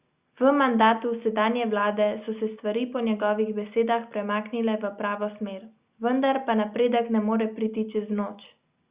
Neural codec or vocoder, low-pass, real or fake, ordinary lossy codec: none; 3.6 kHz; real; Opus, 64 kbps